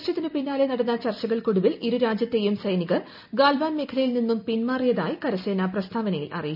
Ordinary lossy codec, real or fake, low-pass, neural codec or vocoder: none; real; 5.4 kHz; none